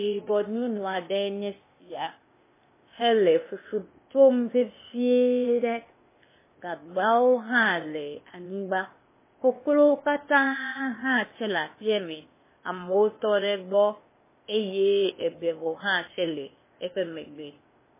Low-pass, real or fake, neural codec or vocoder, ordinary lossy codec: 3.6 kHz; fake; codec, 16 kHz, 0.8 kbps, ZipCodec; MP3, 16 kbps